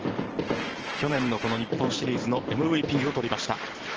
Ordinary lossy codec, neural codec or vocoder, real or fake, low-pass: Opus, 16 kbps; vocoder, 44.1 kHz, 128 mel bands every 512 samples, BigVGAN v2; fake; 7.2 kHz